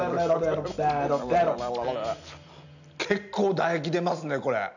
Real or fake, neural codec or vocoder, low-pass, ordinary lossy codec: real; none; 7.2 kHz; none